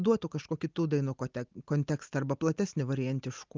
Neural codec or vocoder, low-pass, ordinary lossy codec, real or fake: none; 7.2 kHz; Opus, 24 kbps; real